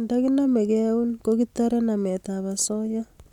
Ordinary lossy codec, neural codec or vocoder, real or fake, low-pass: none; none; real; 19.8 kHz